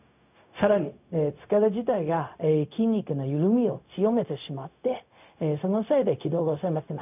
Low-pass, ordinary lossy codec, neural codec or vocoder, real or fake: 3.6 kHz; none; codec, 16 kHz, 0.4 kbps, LongCat-Audio-Codec; fake